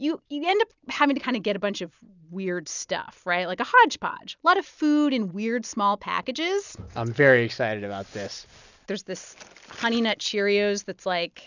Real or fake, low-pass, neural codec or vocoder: real; 7.2 kHz; none